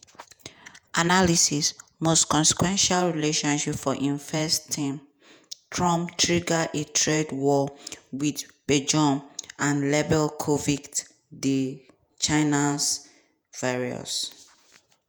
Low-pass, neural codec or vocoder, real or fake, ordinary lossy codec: none; vocoder, 48 kHz, 128 mel bands, Vocos; fake; none